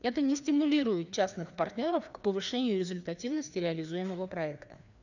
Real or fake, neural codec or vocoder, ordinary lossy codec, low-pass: fake; codec, 16 kHz, 2 kbps, FreqCodec, larger model; none; 7.2 kHz